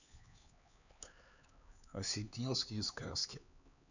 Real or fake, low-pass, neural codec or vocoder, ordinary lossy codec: fake; 7.2 kHz; codec, 16 kHz, 4 kbps, X-Codec, HuBERT features, trained on LibriSpeech; none